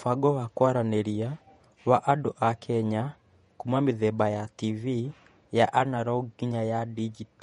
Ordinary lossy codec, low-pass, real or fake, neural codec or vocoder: MP3, 48 kbps; 19.8 kHz; fake; vocoder, 48 kHz, 128 mel bands, Vocos